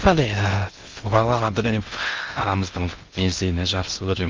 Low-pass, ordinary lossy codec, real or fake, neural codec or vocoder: 7.2 kHz; Opus, 24 kbps; fake; codec, 16 kHz in and 24 kHz out, 0.6 kbps, FocalCodec, streaming, 2048 codes